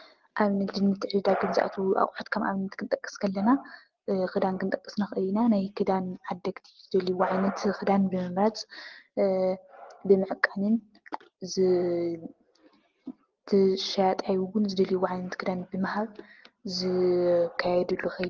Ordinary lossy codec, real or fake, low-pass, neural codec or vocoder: Opus, 16 kbps; real; 7.2 kHz; none